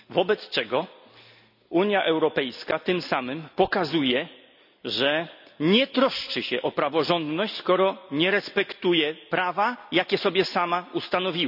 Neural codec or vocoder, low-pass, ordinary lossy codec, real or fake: none; 5.4 kHz; none; real